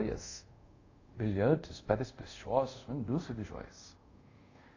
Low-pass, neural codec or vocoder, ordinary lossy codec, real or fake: 7.2 kHz; codec, 24 kHz, 0.5 kbps, DualCodec; AAC, 48 kbps; fake